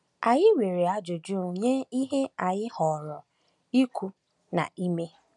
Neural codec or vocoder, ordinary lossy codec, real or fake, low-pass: none; none; real; 10.8 kHz